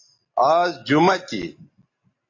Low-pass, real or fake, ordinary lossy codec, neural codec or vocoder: 7.2 kHz; real; AAC, 32 kbps; none